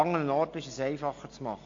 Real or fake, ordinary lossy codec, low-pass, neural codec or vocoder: real; AAC, 48 kbps; 7.2 kHz; none